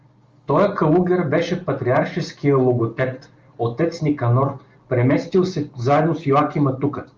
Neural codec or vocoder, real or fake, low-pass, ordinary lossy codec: none; real; 7.2 kHz; Opus, 32 kbps